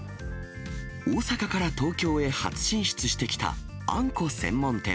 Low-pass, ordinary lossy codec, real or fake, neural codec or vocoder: none; none; real; none